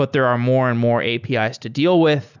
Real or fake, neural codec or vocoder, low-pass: real; none; 7.2 kHz